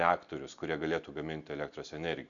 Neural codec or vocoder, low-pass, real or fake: none; 7.2 kHz; real